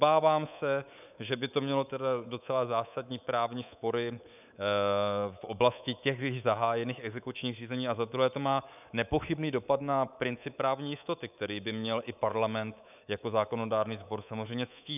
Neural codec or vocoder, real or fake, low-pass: codec, 24 kHz, 3.1 kbps, DualCodec; fake; 3.6 kHz